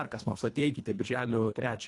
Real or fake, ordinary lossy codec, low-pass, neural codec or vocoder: fake; AAC, 48 kbps; 10.8 kHz; codec, 24 kHz, 1.5 kbps, HILCodec